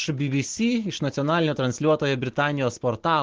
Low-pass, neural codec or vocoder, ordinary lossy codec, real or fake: 7.2 kHz; none; Opus, 16 kbps; real